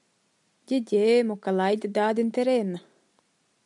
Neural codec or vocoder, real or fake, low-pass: none; real; 10.8 kHz